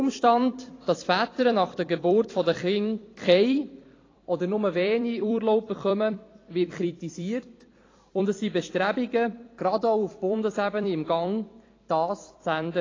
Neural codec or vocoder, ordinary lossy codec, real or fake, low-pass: vocoder, 44.1 kHz, 128 mel bands every 256 samples, BigVGAN v2; AAC, 32 kbps; fake; 7.2 kHz